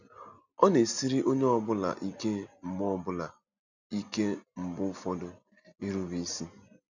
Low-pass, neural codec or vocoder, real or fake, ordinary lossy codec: 7.2 kHz; none; real; none